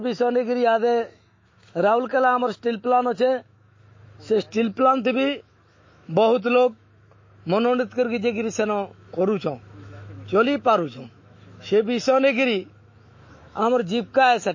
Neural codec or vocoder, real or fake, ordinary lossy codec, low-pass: autoencoder, 48 kHz, 128 numbers a frame, DAC-VAE, trained on Japanese speech; fake; MP3, 32 kbps; 7.2 kHz